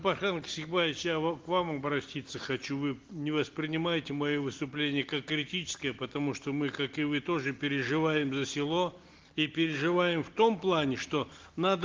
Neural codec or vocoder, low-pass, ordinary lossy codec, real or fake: none; 7.2 kHz; Opus, 16 kbps; real